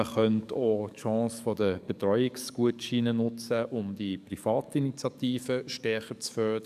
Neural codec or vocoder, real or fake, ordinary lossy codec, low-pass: codec, 44.1 kHz, 7.8 kbps, DAC; fake; none; 14.4 kHz